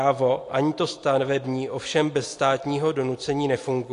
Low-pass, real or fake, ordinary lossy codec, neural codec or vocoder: 10.8 kHz; real; AAC, 48 kbps; none